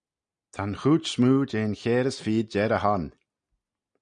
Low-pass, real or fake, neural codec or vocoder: 9.9 kHz; real; none